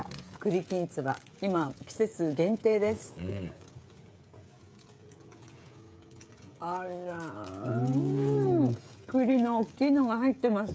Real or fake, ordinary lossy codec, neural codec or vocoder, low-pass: fake; none; codec, 16 kHz, 16 kbps, FreqCodec, smaller model; none